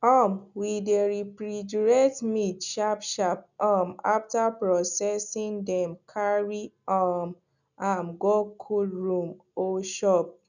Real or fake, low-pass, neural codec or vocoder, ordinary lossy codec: real; 7.2 kHz; none; none